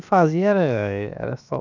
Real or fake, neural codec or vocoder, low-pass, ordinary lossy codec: fake; codec, 16 kHz, 0.7 kbps, FocalCodec; 7.2 kHz; none